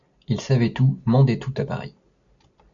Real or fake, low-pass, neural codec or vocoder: real; 7.2 kHz; none